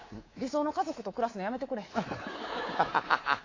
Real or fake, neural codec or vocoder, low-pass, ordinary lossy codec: fake; codec, 24 kHz, 3.1 kbps, DualCodec; 7.2 kHz; AAC, 32 kbps